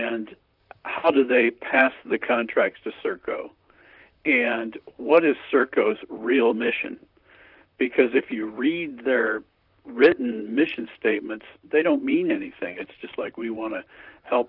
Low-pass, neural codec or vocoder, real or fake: 5.4 kHz; vocoder, 44.1 kHz, 128 mel bands, Pupu-Vocoder; fake